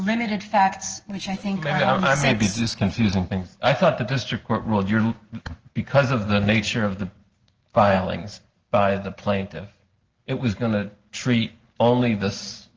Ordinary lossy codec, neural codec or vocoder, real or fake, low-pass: Opus, 16 kbps; none; real; 7.2 kHz